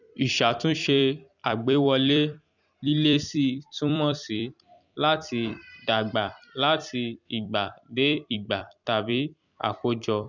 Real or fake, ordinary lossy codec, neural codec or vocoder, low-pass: fake; none; vocoder, 44.1 kHz, 128 mel bands every 256 samples, BigVGAN v2; 7.2 kHz